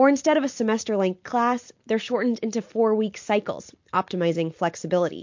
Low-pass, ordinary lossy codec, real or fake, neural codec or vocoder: 7.2 kHz; MP3, 48 kbps; real; none